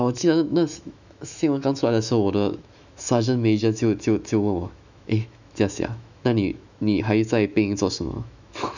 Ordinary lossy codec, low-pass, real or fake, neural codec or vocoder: none; 7.2 kHz; fake; autoencoder, 48 kHz, 128 numbers a frame, DAC-VAE, trained on Japanese speech